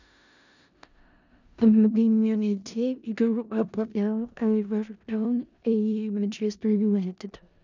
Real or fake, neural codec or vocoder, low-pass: fake; codec, 16 kHz in and 24 kHz out, 0.4 kbps, LongCat-Audio-Codec, four codebook decoder; 7.2 kHz